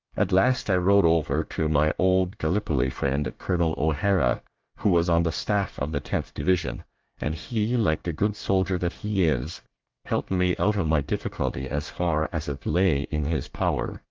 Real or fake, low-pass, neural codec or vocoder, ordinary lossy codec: fake; 7.2 kHz; codec, 24 kHz, 1 kbps, SNAC; Opus, 32 kbps